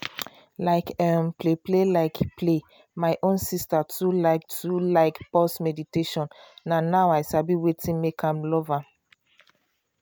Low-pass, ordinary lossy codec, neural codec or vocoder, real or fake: none; none; none; real